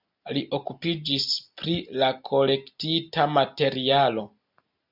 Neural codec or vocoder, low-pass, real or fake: none; 5.4 kHz; real